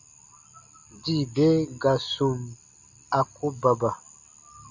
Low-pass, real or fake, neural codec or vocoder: 7.2 kHz; real; none